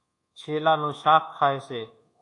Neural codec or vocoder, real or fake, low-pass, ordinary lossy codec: codec, 24 kHz, 1.2 kbps, DualCodec; fake; 10.8 kHz; AAC, 48 kbps